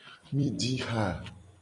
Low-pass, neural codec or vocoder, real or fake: 10.8 kHz; vocoder, 44.1 kHz, 128 mel bands every 256 samples, BigVGAN v2; fake